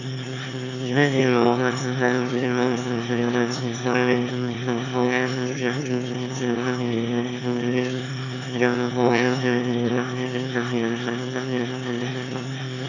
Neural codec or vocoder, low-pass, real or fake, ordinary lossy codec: autoencoder, 22.05 kHz, a latent of 192 numbers a frame, VITS, trained on one speaker; 7.2 kHz; fake; none